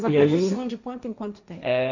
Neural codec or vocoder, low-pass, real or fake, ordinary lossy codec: codec, 16 kHz, 1.1 kbps, Voila-Tokenizer; 7.2 kHz; fake; none